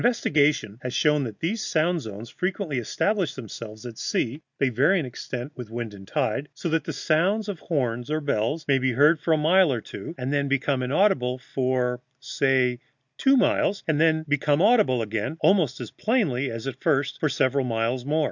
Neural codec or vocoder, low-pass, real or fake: none; 7.2 kHz; real